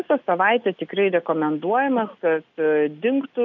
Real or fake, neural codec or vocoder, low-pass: real; none; 7.2 kHz